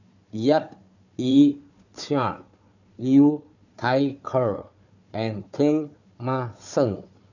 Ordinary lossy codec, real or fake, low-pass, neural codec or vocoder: none; fake; 7.2 kHz; codec, 16 kHz, 4 kbps, FunCodec, trained on Chinese and English, 50 frames a second